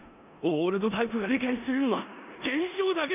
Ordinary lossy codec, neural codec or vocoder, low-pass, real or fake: none; codec, 16 kHz in and 24 kHz out, 0.9 kbps, LongCat-Audio-Codec, four codebook decoder; 3.6 kHz; fake